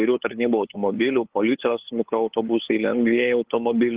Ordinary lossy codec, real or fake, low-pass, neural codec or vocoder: Opus, 16 kbps; fake; 3.6 kHz; codec, 16 kHz, 8 kbps, FunCodec, trained on LibriTTS, 25 frames a second